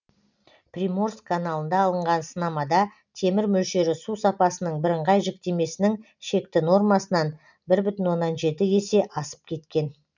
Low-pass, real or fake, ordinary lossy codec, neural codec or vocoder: 7.2 kHz; real; none; none